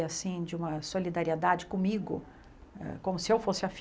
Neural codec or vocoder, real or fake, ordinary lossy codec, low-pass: none; real; none; none